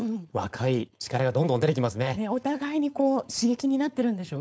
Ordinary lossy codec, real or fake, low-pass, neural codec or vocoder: none; fake; none; codec, 16 kHz, 4.8 kbps, FACodec